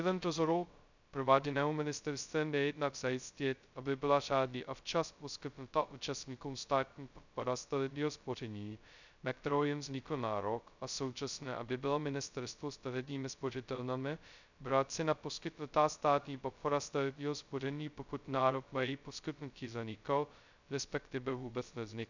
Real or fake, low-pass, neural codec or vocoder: fake; 7.2 kHz; codec, 16 kHz, 0.2 kbps, FocalCodec